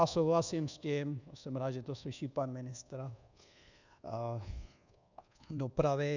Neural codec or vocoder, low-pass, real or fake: codec, 24 kHz, 1.2 kbps, DualCodec; 7.2 kHz; fake